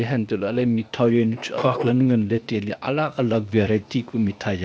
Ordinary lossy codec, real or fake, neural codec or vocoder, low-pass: none; fake; codec, 16 kHz, 0.8 kbps, ZipCodec; none